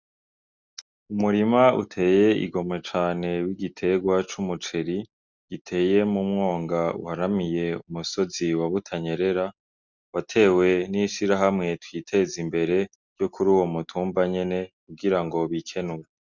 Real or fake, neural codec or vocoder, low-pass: real; none; 7.2 kHz